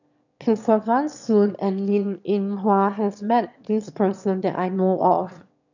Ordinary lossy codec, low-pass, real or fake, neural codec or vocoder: none; 7.2 kHz; fake; autoencoder, 22.05 kHz, a latent of 192 numbers a frame, VITS, trained on one speaker